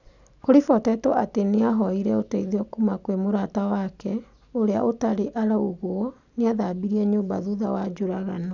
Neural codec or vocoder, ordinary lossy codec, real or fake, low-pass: none; none; real; 7.2 kHz